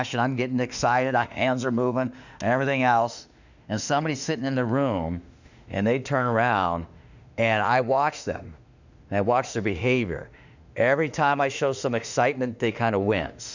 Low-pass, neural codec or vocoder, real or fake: 7.2 kHz; autoencoder, 48 kHz, 32 numbers a frame, DAC-VAE, trained on Japanese speech; fake